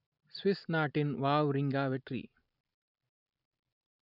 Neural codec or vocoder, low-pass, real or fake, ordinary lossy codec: vocoder, 44.1 kHz, 128 mel bands every 256 samples, BigVGAN v2; 5.4 kHz; fake; none